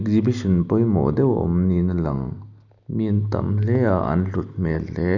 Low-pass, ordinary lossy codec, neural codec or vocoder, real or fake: 7.2 kHz; none; none; real